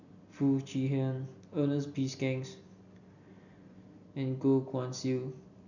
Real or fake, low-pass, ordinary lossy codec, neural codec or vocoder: real; 7.2 kHz; none; none